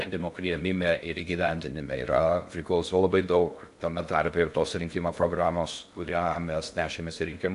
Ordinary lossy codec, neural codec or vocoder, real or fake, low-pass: AAC, 64 kbps; codec, 16 kHz in and 24 kHz out, 0.6 kbps, FocalCodec, streaming, 2048 codes; fake; 10.8 kHz